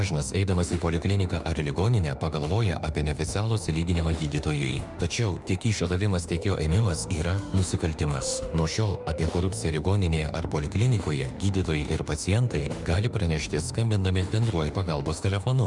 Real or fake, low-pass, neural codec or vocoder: fake; 10.8 kHz; autoencoder, 48 kHz, 32 numbers a frame, DAC-VAE, trained on Japanese speech